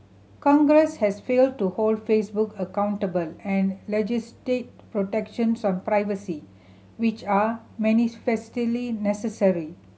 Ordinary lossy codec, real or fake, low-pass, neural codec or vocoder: none; real; none; none